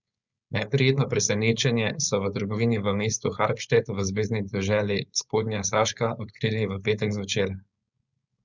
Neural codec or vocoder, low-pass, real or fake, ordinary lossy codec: codec, 16 kHz, 4.8 kbps, FACodec; 7.2 kHz; fake; Opus, 64 kbps